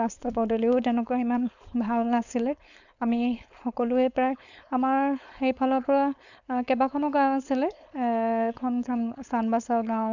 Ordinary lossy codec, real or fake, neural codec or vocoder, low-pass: none; fake; codec, 16 kHz, 4.8 kbps, FACodec; 7.2 kHz